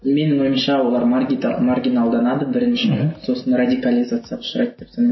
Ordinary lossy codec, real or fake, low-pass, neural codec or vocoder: MP3, 24 kbps; real; 7.2 kHz; none